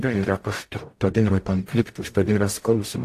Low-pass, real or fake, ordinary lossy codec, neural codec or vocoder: 14.4 kHz; fake; AAC, 64 kbps; codec, 44.1 kHz, 0.9 kbps, DAC